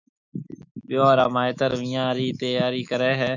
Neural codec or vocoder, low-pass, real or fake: autoencoder, 48 kHz, 128 numbers a frame, DAC-VAE, trained on Japanese speech; 7.2 kHz; fake